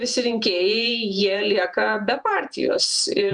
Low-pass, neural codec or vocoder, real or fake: 10.8 kHz; vocoder, 48 kHz, 128 mel bands, Vocos; fake